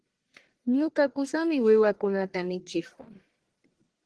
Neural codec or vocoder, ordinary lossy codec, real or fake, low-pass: codec, 44.1 kHz, 1.7 kbps, Pupu-Codec; Opus, 16 kbps; fake; 10.8 kHz